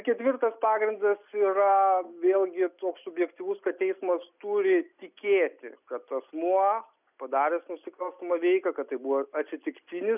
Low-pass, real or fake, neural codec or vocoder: 3.6 kHz; real; none